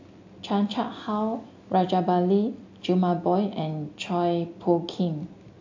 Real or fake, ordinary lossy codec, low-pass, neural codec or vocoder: real; AAC, 48 kbps; 7.2 kHz; none